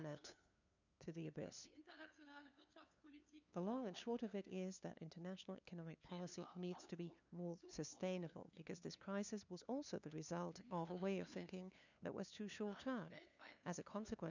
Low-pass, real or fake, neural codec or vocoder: 7.2 kHz; fake; codec, 16 kHz, 2 kbps, FunCodec, trained on LibriTTS, 25 frames a second